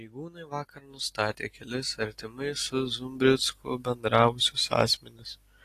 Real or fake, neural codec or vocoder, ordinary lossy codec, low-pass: real; none; AAC, 48 kbps; 14.4 kHz